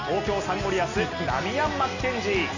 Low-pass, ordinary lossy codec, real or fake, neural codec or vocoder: 7.2 kHz; none; real; none